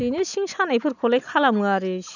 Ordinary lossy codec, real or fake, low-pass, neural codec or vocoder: none; real; 7.2 kHz; none